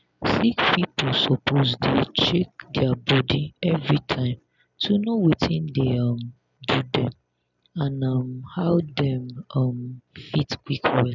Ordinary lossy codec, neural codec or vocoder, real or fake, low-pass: none; none; real; 7.2 kHz